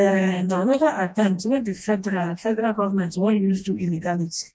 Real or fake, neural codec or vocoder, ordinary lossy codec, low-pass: fake; codec, 16 kHz, 1 kbps, FreqCodec, smaller model; none; none